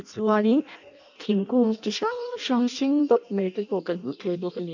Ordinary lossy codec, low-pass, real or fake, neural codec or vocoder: none; 7.2 kHz; fake; codec, 16 kHz in and 24 kHz out, 0.6 kbps, FireRedTTS-2 codec